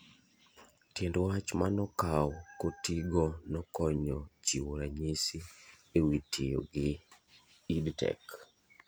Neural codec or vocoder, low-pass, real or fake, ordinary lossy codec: none; none; real; none